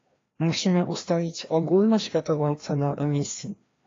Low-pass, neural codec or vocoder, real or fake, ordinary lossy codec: 7.2 kHz; codec, 16 kHz, 1 kbps, FreqCodec, larger model; fake; AAC, 32 kbps